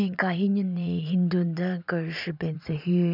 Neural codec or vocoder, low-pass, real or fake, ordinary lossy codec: vocoder, 44.1 kHz, 80 mel bands, Vocos; 5.4 kHz; fake; none